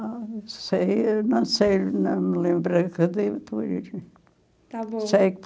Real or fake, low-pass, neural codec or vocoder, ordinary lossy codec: real; none; none; none